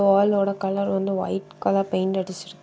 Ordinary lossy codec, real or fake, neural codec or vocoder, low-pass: none; real; none; none